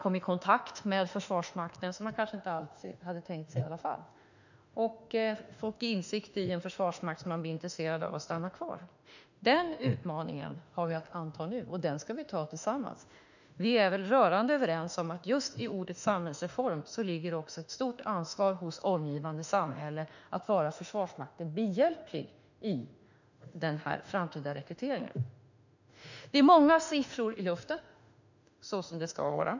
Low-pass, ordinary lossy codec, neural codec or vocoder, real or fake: 7.2 kHz; none; autoencoder, 48 kHz, 32 numbers a frame, DAC-VAE, trained on Japanese speech; fake